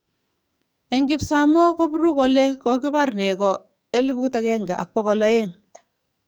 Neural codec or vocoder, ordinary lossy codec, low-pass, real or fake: codec, 44.1 kHz, 2.6 kbps, SNAC; none; none; fake